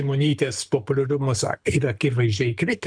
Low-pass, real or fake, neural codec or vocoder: 9.9 kHz; fake; codec, 24 kHz, 6 kbps, HILCodec